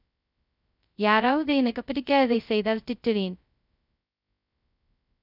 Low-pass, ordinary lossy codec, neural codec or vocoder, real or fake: 5.4 kHz; none; codec, 16 kHz, 0.2 kbps, FocalCodec; fake